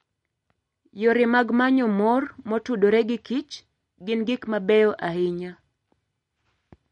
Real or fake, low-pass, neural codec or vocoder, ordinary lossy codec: real; 10.8 kHz; none; MP3, 48 kbps